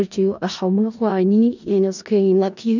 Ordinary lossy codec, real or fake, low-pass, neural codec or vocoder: none; fake; 7.2 kHz; codec, 16 kHz in and 24 kHz out, 0.4 kbps, LongCat-Audio-Codec, four codebook decoder